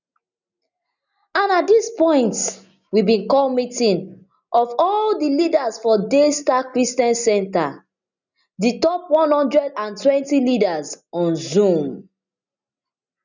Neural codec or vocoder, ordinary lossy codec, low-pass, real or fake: none; none; 7.2 kHz; real